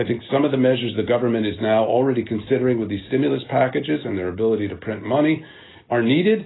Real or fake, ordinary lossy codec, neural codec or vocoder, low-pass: real; AAC, 16 kbps; none; 7.2 kHz